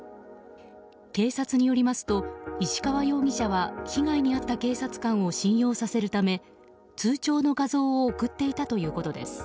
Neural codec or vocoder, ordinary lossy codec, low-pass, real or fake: none; none; none; real